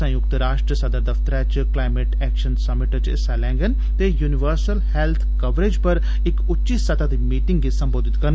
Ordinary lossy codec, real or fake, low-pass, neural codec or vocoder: none; real; none; none